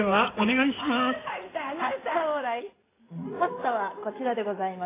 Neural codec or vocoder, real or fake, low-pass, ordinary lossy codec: codec, 16 kHz in and 24 kHz out, 2.2 kbps, FireRedTTS-2 codec; fake; 3.6 kHz; AAC, 16 kbps